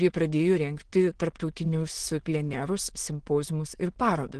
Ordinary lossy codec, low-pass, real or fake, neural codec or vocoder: Opus, 16 kbps; 9.9 kHz; fake; autoencoder, 22.05 kHz, a latent of 192 numbers a frame, VITS, trained on many speakers